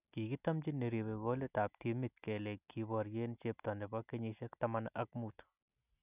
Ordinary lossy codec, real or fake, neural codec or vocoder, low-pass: none; real; none; 3.6 kHz